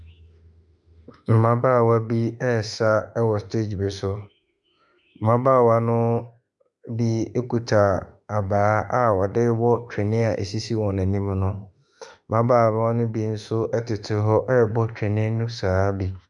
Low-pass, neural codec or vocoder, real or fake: 10.8 kHz; autoencoder, 48 kHz, 32 numbers a frame, DAC-VAE, trained on Japanese speech; fake